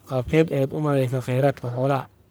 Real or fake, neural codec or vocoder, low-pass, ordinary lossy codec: fake; codec, 44.1 kHz, 1.7 kbps, Pupu-Codec; none; none